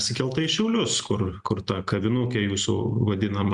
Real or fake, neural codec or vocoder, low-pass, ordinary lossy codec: real; none; 10.8 kHz; Opus, 64 kbps